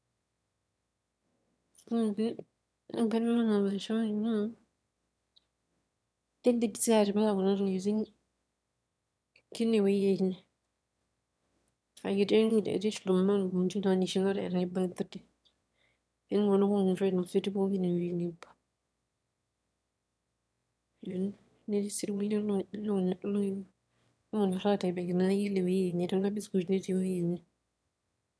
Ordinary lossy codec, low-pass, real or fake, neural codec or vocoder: none; none; fake; autoencoder, 22.05 kHz, a latent of 192 numbers a frame, VITS, trained on one speaker